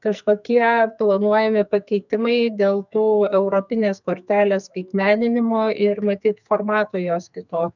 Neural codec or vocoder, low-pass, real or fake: codec, 44.1 kHz, 2.6 kbps, SNAC; 7.2 kHz; fake